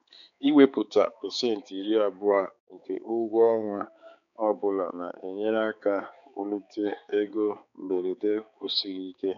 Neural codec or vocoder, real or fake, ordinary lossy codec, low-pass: codec, 16 kHz, 4 kbps, X-Codec, HuBERT features, trained on balanced general audio; fake; none; 7.2 kHz